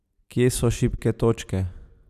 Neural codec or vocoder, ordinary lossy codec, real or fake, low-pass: none; none; real; 14.4 kHz